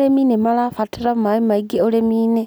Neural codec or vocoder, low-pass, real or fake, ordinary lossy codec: none; none; real; none